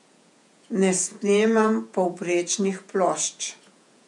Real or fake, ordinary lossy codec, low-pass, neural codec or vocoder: fake; MP3, 64 kbps; 10.8 kHz; vocoder, 48 kHz, 128 mel bands, Vocos